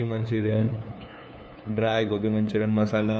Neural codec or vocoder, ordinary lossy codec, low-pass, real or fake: codec, 16 kHz, 2 kbps, FunCodec, trained on LibriTTS, 25 frames a second; none; none; fake